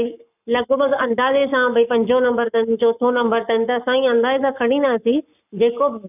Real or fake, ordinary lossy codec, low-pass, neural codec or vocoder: fake; none; 3.6 kHz; codec, 44.1 kHz, 7.8 kbps, Pupu-Codec